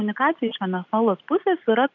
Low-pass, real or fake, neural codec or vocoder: 7.2 kHz; real; none